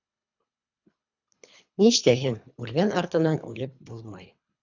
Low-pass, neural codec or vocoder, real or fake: 7.2 kHz; codec, 24 kHz, 3 kbps, HILCodec; fake